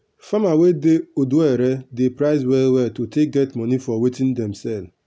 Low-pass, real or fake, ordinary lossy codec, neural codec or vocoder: none; real; none; none